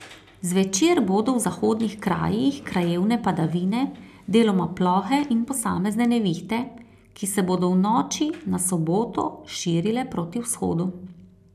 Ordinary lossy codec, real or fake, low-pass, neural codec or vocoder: none; real; 14.4 kHz; none